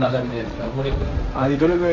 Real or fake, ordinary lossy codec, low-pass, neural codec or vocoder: fake; none; 7.2 kHz; codec, 16 kHz, 1.1 kbps, Voila-Tokenizer